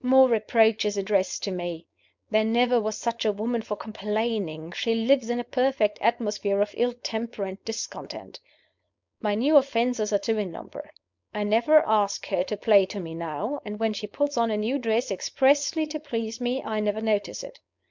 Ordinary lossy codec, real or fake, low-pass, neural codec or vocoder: MP3, 64 kbps; fake; 7.2 kHz; codec, 16 kHz, 4.8 kbps, FACodec